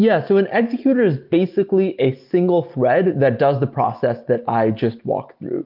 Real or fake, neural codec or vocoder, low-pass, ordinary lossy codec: real; none; 5.4 kHz; Opus, 24 kbps